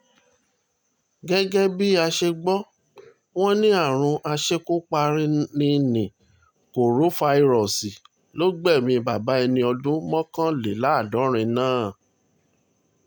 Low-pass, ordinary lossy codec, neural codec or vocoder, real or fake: none; none; none; real